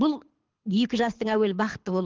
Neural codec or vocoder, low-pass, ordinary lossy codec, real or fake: codec, 24 kHz, 6 kbps, HILCodec; 7.2 kHz; Opus, 16 kbps; fake